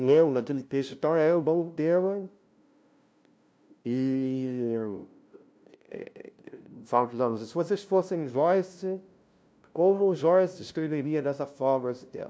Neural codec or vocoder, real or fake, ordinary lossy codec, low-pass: codec, 16 kHz, 0.5 kbps, FunCodec, trained on LibriTTS, 25 frames a second; fake; none; none